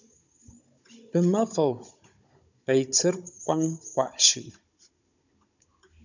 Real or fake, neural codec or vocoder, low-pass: fake; codec, 16 kHz, 16 kbps, FunCodec, trained on Chinese and English, 50 frames a second; 7.2 kHz